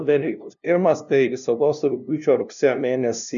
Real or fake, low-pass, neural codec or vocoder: fake; 7.2 kHz; codec, 16 kHz, 0.5 kbps, FunCodec, trained on LibriTTS, 25 frames a second